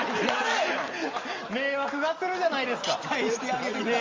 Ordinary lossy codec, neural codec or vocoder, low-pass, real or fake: Opus, 32 kbps; none; 7.2 kHz; real